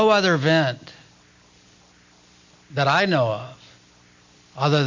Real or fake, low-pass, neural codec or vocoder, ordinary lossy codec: real; 7.2 kHz; none; MP3, 48 kbps